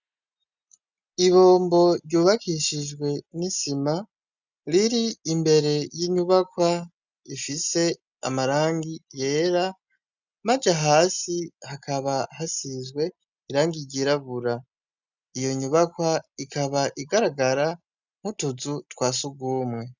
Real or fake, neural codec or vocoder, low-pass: real; none; 7.2 kHz